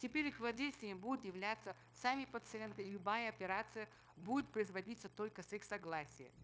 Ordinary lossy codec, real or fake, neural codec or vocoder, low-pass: none; fake; codec, 16 kHz, 0.9 kbps, LongCat-Audio-Codec; none